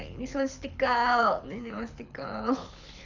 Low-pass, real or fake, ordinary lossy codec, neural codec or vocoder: 7.2 kHz; fake; none; codec, 24 kHz, 6 kbps, HILCodec